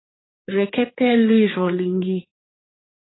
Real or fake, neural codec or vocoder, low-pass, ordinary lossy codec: fake; codec, 16 kHz, 4 kbps, X-Codec, HuBERT features, trained on general audio; 7.2 kHz; AAC, 16 kbps